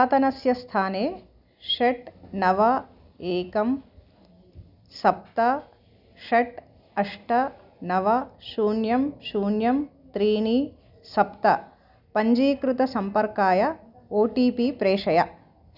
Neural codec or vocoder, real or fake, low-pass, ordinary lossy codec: none; real; 5.4 kHz; none